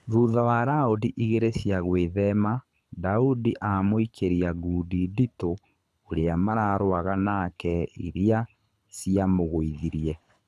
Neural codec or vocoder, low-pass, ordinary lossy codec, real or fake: codec, 24 kHz, 6 kbps, HILCodec; none; none; fake